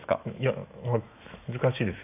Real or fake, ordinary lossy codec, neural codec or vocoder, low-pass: fake; AAC, 32 kbps; autoencoder, 48 kHz, 128 numbers a frame, DAC-VAE, trained on Japanese speech; 3.6 kHz